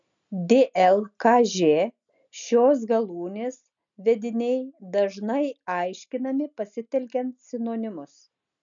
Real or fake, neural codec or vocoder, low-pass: real; none; 7.2 kHz